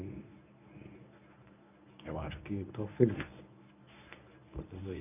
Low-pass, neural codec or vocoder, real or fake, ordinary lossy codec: 3.6 kHz; codec, 24 kHz, 0.9 kbps, WavTokenizer, medium speech release version 1; fake; none